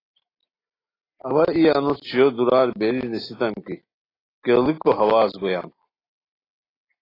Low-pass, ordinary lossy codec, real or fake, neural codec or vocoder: 5.4 kHz; AAC, 24 kbps; real; none